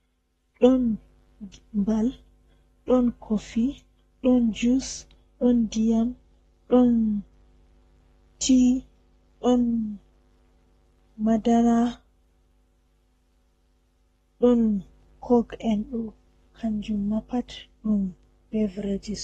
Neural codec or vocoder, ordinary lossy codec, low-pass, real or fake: codec, 44.1 kHz, 7.8 kbps, Pupu-Codec; AAC, 32 kbps; 19.8 kHz; fake